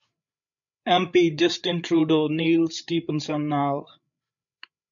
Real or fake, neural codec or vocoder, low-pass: fake; codec, 16 kHz, 8 kbps, FreqCodec, larger model; 7.2 kHz